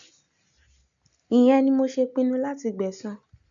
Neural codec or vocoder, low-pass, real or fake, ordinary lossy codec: none; 7.2 kHz; real; none